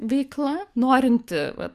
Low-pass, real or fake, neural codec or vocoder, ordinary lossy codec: 14.4 kHz; fake; codec, 44.1 kHz, 7.8 kbps, DAC; Opus, 64 kbps